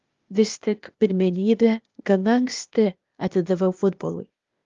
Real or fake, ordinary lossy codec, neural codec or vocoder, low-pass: fake; Opus, 32 kbps; codec, 16 kHz, 0.8 kbps, ZipCodec; 7.2 kHz